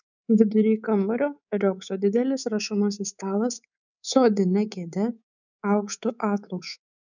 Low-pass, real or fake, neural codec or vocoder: 7.2 kHz; fake; codec, 24 kHz, 3.1 kbps, DualCodec